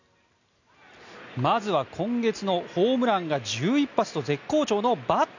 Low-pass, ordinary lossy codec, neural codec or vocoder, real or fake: 7.2 kHz; none; none; real